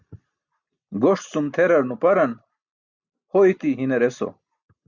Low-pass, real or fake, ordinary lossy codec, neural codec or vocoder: 7.2 kHz; real; Opus, 64 kbps; none